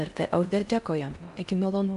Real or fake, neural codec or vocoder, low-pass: fake; codec, 16 kHz in and 24 kHz out, 0.6 kbps, FocalCodec, streaming, 4096 codes; 10.8 kHz